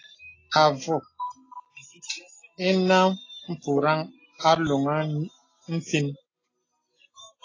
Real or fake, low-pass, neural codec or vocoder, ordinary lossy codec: real; 7.2 kHz; none; AAC, 32 kbps